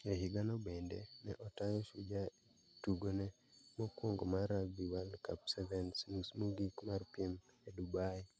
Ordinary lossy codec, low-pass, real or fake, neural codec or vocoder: none; none; real; none